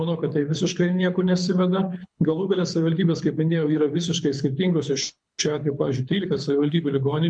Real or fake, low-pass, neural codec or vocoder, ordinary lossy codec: fake; 9.9 kHz; codec, 24 kHz, 6 kbps, HILCodec; MP3, 64 kbps